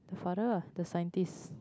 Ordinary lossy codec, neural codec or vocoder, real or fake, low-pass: none; none; real; none